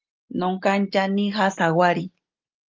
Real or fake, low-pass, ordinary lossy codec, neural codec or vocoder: real; 7.2 kHz; Opus, 32 kbps; none